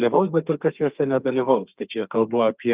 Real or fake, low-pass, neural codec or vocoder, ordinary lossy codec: fake; 3.6 kHz; codec, 32 kHz, 1.9 kbps, SNAC; Opus, 32 kbps